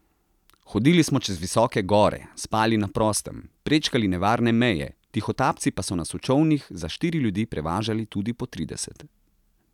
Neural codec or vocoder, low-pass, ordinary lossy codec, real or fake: none; 19.8 kHz; none; real